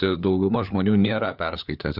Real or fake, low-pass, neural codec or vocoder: fake; 5.4 kHz; codec, 16 kHz, 4 kbps, FunCodec, trained on LibriTTS, 50 frames a second